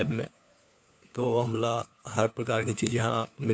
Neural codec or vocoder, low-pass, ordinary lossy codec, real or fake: codec, 16 kHz, 4 kbps, FunCodec, trained on LibriTTS, 50 frames a second; none; none; fake